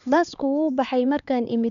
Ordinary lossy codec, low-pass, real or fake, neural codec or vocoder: none; 7.2 kHz; fake; codec, 16 kHz, 4 kbps, X-Codec, HuBERT features, trained on LibriSpeech